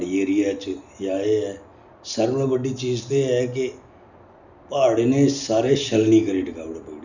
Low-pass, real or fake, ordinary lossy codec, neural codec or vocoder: 7.2 kHz; real; none; none